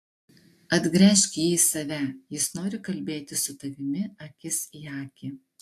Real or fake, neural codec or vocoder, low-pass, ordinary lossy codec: real; none; 14.4 kHz; AAC, 64 kbps